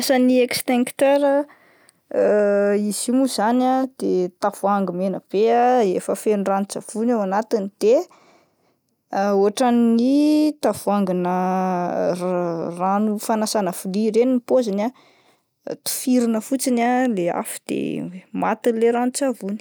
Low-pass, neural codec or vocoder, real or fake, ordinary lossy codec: none; none; real; none